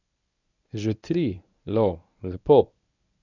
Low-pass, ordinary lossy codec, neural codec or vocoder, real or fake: 7.2 kHz; none; codec, 24 kHz, 0.9 kbps, WavTokenizer, medium speech release version 1; fake